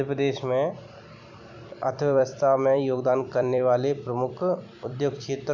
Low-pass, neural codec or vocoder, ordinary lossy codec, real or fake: 7.2 kHz; vocoder, 44.1 kHz, 128 mel bands every 256 samples, BigVGAN v2; MP3, 64 kbps; fake